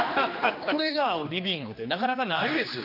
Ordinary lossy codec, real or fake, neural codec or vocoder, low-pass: none; fake; codec, 16 kHz, 2 kbps, X-Codec, HuBERT features, trained on general audio; 5.4 kHz